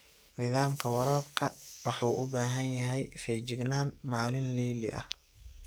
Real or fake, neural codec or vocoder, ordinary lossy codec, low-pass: fake; codec, 44.1 kHz, 2.6 kbps, SNAC; none; none